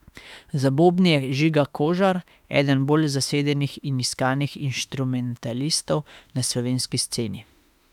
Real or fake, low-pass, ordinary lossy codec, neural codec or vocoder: fake; 19.8 kHz; none; autoencoder, 48 kHz, 32 numbers a frame, DAC-VAE, trained on Japanese speech